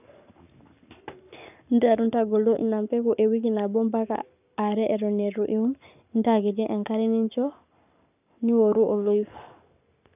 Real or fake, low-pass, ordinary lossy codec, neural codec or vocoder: fake; 3.6 kHz; none; codec, 44.1 kHz, 7.8 kbps, Pupu-Codec